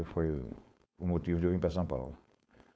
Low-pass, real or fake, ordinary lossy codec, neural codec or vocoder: none; fake; none; codec, 16 kHz, 4.8 kbps, FACodec